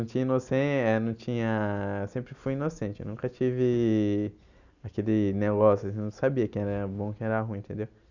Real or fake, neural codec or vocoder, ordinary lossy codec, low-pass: real; none; none; 7.2 kHz